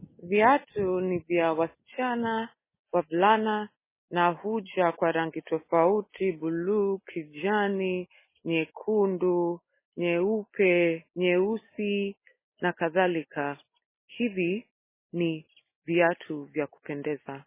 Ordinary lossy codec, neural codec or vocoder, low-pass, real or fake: MP3, 16 kbps; none; 3.6 kHz; real